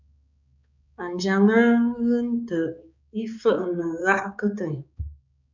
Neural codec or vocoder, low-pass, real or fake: codec, 16 kHz, 4 kbps, X-Codec, HuBERT features, trained on balanced general audio; 7.2 kHz; fake